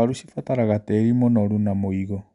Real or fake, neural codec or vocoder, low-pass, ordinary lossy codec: real; none; 10.8 kHz; none